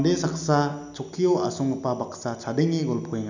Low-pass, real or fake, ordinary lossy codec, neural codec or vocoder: 7.2 kHz; real; none; none